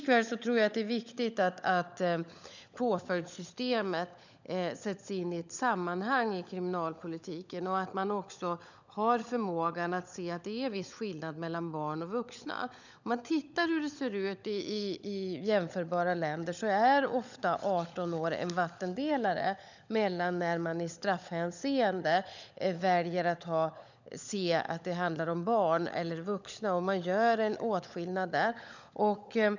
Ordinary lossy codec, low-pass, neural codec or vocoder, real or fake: none; 7.2 kHz; codec, 16 kHz, 16 kbps, FunCodec, trained on LibriTTS, 50 frames a second; fake